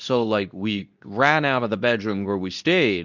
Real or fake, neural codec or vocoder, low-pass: fake; codec, 24 kHz, 0.9 kbps, WavTokenizer, medium speech release version 2; 7.2 kHz